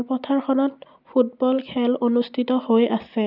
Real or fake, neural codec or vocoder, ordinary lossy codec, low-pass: real; none; none; 5.4 kHz